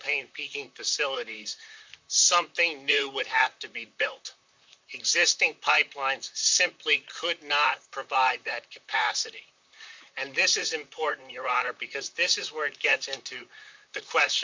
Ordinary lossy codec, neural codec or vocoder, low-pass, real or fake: MP3, 64 kbps; vocoder, 44.1 kHz, 128 mel bands, Pupu-Vocoder; 7.2 kHz; fake